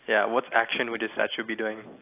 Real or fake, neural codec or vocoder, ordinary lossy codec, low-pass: real; none; AAC, 24 kbps; 3.6 kHz